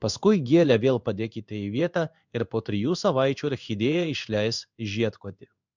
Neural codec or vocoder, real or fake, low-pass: codec, 16 kHz in and 24 kHz out, 1 kbps, XY-Tokenizer; fake; 7.2 kHz